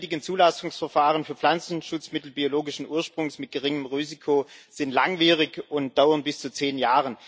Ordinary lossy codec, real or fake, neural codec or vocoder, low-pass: none; real; none; none